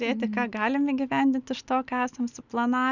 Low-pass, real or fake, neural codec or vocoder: 7.2 kHz; real; none